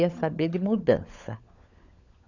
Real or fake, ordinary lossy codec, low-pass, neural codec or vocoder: fake; none; 7.2 kHz; codec, 16 kHz, 16 kbps, FunCodec, trained on LibriTTS, 50 frames a second